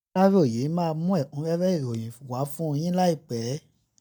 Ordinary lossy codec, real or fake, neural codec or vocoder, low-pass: none; real; none; none